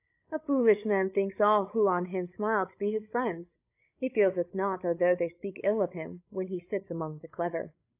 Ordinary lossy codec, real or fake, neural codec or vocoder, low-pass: AAC, 32 kbps; fake; codec, 16 kHz, 16 kbps, FreqCodec, larger model; 3.6 kHz